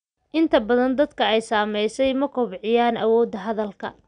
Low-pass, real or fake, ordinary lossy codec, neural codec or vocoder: 9.9 kHz; real; none; none